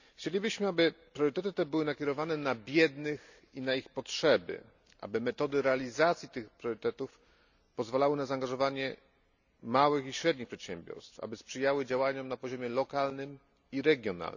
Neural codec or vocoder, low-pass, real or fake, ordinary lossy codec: none; 7.2 kHz; real; none